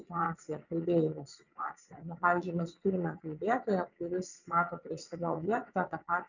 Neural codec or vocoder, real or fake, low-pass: vocoder, 22.05 kHz, 80 mel bands, WaveNeXt; fake; 7.2 kHz